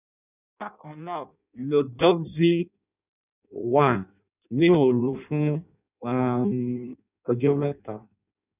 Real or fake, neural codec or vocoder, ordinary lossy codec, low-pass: fake; codec, 16 kHz in and 24 kHz out, 0.6 kbps, FireRedTTS-2 codec; none; 3.6 kHz